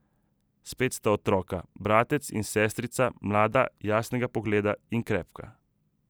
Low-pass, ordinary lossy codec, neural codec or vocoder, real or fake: none; none; none; real